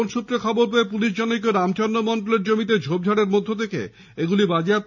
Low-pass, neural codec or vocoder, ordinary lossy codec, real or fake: 7.2 kHz; none; none; real